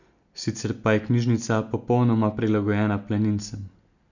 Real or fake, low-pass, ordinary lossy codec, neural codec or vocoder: real; 7.2 kHz; none; none